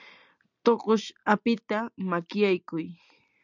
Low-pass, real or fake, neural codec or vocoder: 7.2 kHz; real; none